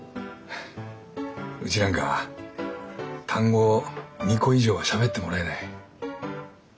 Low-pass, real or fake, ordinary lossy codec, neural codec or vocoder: none; real; none; none